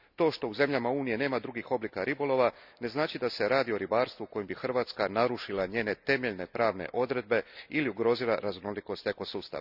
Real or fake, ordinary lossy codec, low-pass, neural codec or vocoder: real; none; 5.4 kHz; none